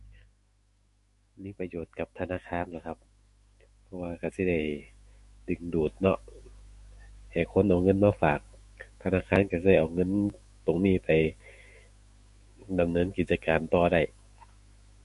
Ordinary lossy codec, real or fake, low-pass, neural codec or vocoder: MP3, 48 kbps; fake; 14.4 kHz; autoencoder, 48 kHz, 128 numbers a frame, DAC-VAE, trained on Japanese speech